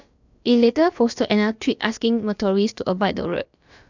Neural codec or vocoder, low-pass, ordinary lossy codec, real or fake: codec, 16 kHz, about 1 kbps, DyCAST, with the encoder's durations; 7.2 kHz; none; fake